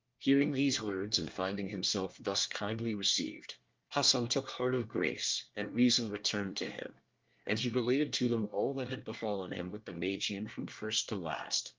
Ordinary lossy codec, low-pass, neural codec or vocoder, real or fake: Opus, 24 kbps; 7.2 kHz; codec, 24 kHz, 1 kbps, SNAC; fake